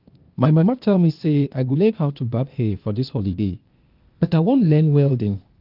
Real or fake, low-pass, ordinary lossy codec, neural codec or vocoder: fake; 5.4 kHz; Opus, 24 kbps; codec, 16 kHz, 0.8 kbps, ZipCodec